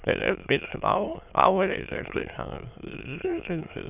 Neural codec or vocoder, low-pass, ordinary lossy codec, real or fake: autoencoder, 22.05 kHz, a latent of 192 numbers a frame, VITS, trained on many speakers; 3.6 kHz; none; fake